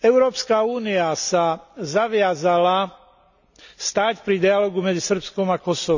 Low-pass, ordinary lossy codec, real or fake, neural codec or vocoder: 7.2 kHz; none; real; none